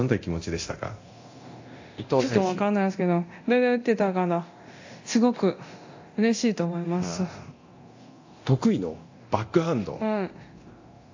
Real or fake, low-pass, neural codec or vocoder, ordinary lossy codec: fake; 7.2 kHz; codec, 24 kHz, 0.9 kbps, DualCodec; AAC, 48 kbps